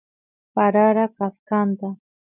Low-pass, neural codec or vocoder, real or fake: 3.6 kHz; none; real